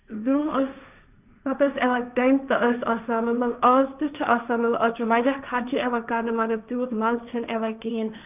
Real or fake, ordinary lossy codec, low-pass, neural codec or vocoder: fake; none; 3.6 kHz; codec, 16 kHz, 1.1 kbps, Voila-Tokenizer